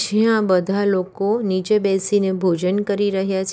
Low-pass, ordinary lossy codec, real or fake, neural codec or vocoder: none; none; real; none